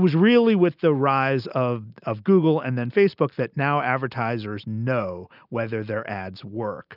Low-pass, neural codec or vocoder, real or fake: 5.4 kHz; none; real